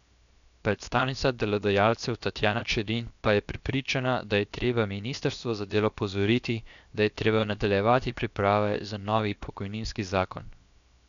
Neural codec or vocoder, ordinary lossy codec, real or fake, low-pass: codec, 16 kHz, 0.7 kbps, FocalCodec; none; fake; 7.2 kHz